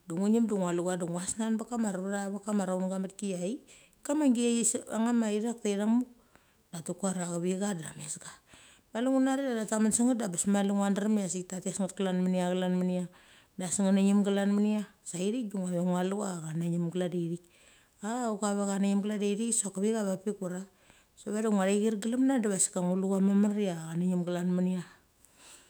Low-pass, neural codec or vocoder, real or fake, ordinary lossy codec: none; autoencoder, 48 kHz, 128 numbers a frame, DAC-VAE, trained on Japanese speech; fake; none